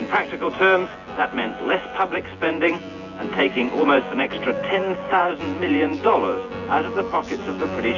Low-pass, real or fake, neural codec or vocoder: 7.2 kHz; fake; vocoder, 24 kHz, 100 mel bands, Vocos